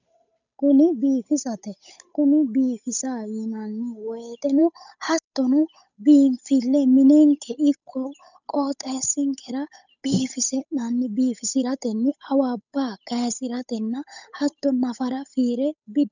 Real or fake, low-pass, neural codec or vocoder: fake; 7.2 kHz; codec, 16 kHz, 8 kbps, FunCodec, trained on Chinese and English, 25 frames a second